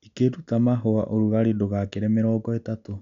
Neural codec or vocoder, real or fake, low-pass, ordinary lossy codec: none; real; 7.2 kHz; none